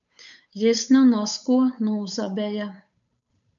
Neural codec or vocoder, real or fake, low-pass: codec, 16 kHz, 8 kbps, FunCodec, trained on Chinese and English, 25 frames a second; fake; 7.2 kHz